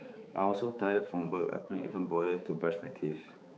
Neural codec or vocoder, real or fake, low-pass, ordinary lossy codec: codec, 16 kHz, 4 kbps, X-Codec, HuBERT features, trained on balanced general audio; fake; none; none